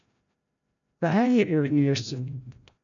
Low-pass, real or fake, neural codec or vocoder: 7.2 kHz; fake; codec, 16 kHz, 0.5 kbps, FreqCodec, larger model